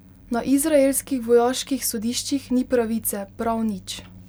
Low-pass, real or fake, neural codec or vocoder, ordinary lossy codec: none; real; none; none